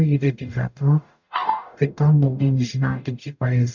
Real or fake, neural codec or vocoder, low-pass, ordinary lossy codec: fake; codec, 44.1 kHz, 0.9 kbps, DAC; 7.2 kHz; none